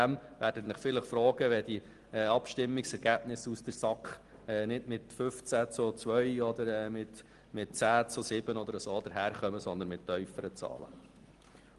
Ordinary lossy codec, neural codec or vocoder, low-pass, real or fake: Opus, 24 kbps; none; 10.8 kHz; real